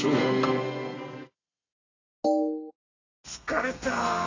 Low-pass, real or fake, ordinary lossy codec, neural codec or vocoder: 7.2 kHz; fake; AAC, 32 kbps; codec, 32 kHz, 1.9 kbps, SNAC